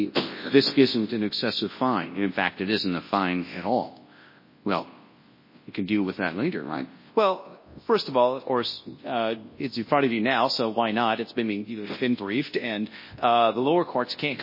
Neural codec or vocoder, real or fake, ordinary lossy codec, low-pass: codec, 24 kHz, 0.9 kbps, WavTokenizer, large speech release; fake; MP3, 24 kbps; 5.4 kHz